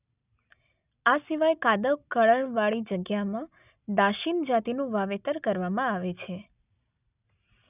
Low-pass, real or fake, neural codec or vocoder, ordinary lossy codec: 3.6 kHz; real; none; none